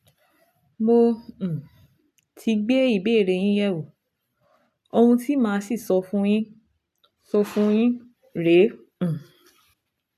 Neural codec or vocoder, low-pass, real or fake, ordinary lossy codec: none; 14.4 kHz; real; AAC, 96 kbps